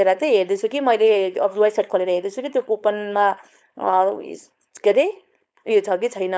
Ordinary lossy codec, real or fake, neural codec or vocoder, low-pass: none; fake; codec, 16 kHz, 4.8 kbps, FACodec; none